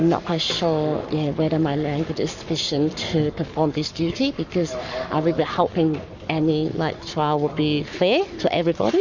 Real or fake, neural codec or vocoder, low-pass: fake; codec, 44.1 kHz, 7.8 kbps, Pupu-Codec; 7.2 kHz